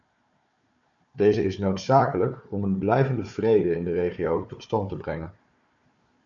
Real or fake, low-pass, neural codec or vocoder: fake; 7.2 kHz; codec, 16 kHz, 4 kbps, FunCodec, trained on Chinese and English, 50 frames a second